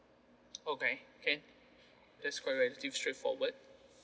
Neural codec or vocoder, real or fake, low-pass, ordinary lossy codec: none; real; none; none